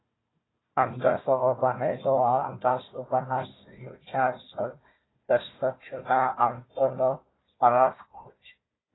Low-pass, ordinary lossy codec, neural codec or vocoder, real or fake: 7.2 kHz; AAC, 16 kbps; codec, 16 kHz, 1 kbps, FunCodec, trained on Chinese and English, 50 frames a second; fake